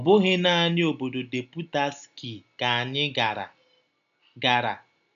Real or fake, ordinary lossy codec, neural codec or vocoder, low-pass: real; none; none; 7.2 kHz